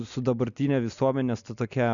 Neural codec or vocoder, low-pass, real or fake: none; 7.2 kHz; real